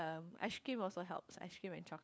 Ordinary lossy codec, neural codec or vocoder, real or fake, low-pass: none; codec, 16 kHz, 4 kbps, FunCodec, trained on LibriTTS, 50 frames a second; fake; none